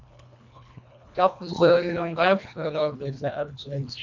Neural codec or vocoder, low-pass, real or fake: codec, 24 kHz, 1.5 kbps, HILCodec; 7.2 kHz; fake